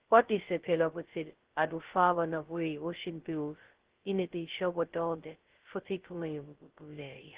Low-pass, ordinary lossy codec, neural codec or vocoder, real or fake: 3.6 kHz; Opus, 16 kbps; codec, 16 kHz, 0.2 kbps, FocalCodec; fake